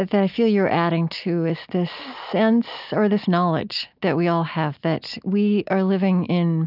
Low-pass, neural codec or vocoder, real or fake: 5.4 kHz; none; real